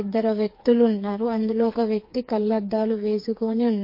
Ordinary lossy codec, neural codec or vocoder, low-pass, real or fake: MP3, 32 kbps; codec, 16 kHz, 4 kbps, FreqCodec, smaller model; 5.4 kHz; fake